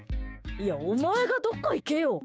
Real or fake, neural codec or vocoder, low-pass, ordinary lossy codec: fake; codec, 16 kHz, 6 kbps, DAC; none; none